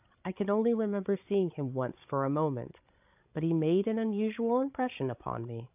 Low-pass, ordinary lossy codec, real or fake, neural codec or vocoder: 3.6 kHz; AAC, 32 kbps; fake; codec, 16 kHz, 16 kbps, FreqCodec, larger model